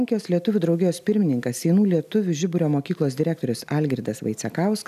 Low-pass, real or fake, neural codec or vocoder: 14.4 kHz; real; none